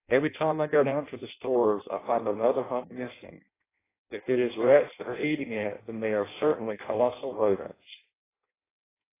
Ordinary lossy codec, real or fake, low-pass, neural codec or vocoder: AAC, 16 kbps; fake; 3.6 kHz; codec, 16 kHz in and 24 kHz out, 0.6 kbps, FireRedTTS-2 codec